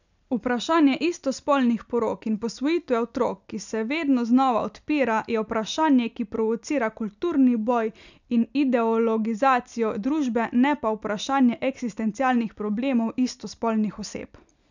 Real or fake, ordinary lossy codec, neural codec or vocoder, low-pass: real; none; none; 7.2 kHz